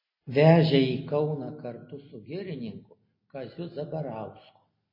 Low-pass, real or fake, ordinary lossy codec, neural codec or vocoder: 5.4 kHz; real; MP3, 24 kbps; none